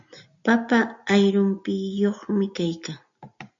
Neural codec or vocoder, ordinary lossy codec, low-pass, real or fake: none; AAC, 64 kbps; 7.2 kHz; real